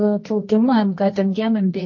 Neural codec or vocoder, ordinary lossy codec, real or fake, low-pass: codec, 24 kHz, 0.9 kbps, WavTokenizer, medium music audio release; MP3, 32 kbps; fake; 7.2 kHz